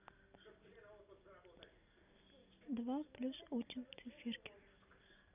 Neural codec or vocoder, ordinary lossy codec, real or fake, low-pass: none; none; real; 3.6 kHz